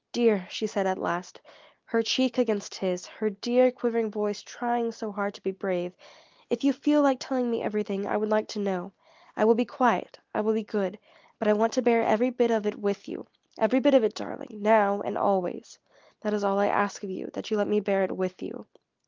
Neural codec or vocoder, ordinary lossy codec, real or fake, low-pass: none; Opus, 24 kbps; real; 7.2 kHz